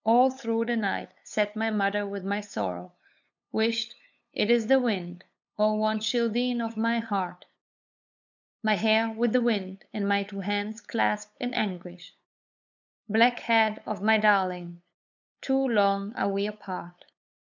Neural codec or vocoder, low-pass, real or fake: codec, 16 kHz, 8 kbps, FunCodec, trained on LibriTTS, 25 frames a second; 7.2 kHz; fake